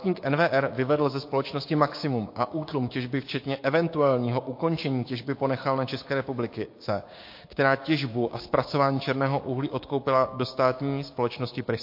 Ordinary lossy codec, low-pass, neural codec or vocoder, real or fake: MP3, 32 kbps; 5.4 kHz; codec, 16 kHz, 6 kbps, DAC; fake